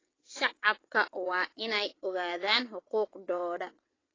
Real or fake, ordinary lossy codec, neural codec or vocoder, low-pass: fake; AAC, 32 kbps; vocoder, 22.05 kHz, 80 mel bands, WaveNeXt; 7.2 kHz